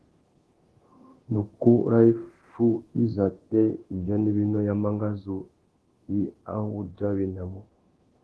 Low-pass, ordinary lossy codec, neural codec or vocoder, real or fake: 10.8 kHz; Opus, 16 kbps; codec, 24 kHz, 0.9 kbps, DualCodec; fake